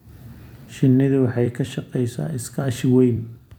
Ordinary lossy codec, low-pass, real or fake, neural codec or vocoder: none; 19.8 kHz; fake; vocoder, 44.1 kHz, 128 mel bands every 256 samples, BigVGAN v2